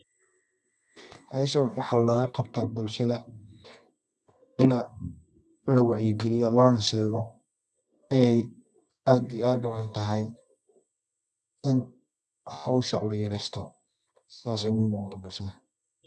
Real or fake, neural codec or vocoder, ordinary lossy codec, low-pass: fake; codec, 24 kHz, 0.9 kbps, WavTokenizer, medium music audio release; none; none